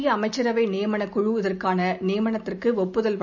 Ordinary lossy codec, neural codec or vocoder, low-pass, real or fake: none; none; 7.2 kHz; real